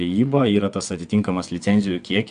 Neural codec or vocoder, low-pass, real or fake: vocoder, 22.05 kHz, 80 mel bands, WaveNeXt; 9.9 kHz; fake